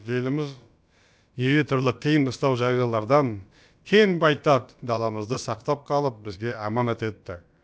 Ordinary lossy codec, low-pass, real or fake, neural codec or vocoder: none; none; fake; codec, 16 kHz, about 1 kbps, DyCAST, with the encoder's durations